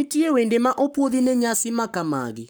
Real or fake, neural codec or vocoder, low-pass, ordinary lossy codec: fake; codec, 44.1 kHz, 7.8 kbps, Pupu-Codec; none; none